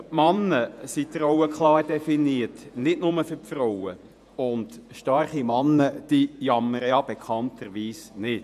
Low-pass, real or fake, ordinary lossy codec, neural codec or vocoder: 14.4 kHz; fake; none; vocoder, 48 kHz, 128 mel bands, Vocos